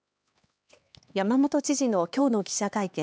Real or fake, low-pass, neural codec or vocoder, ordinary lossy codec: fake; none; codec, 16 kHz, 2 kbps, X-Codec, HuBERT features, trained on LibriSpeech; none